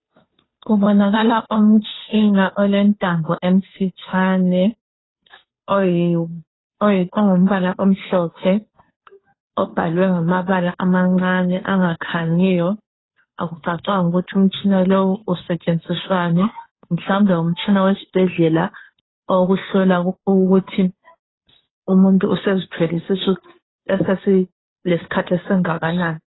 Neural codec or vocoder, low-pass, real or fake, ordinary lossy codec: codec, 16 kHz, 2 kbps, FunCodec, trained on Chinese and English, 25 frames a second; 7.2 kHz; fake; AAC, 16 kbps